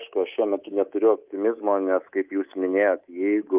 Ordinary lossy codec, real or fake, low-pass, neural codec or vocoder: Opus, 24 kbps; fake; 3.6 kHz; codec, 24 kHz, 3.1 kbps, DualCodec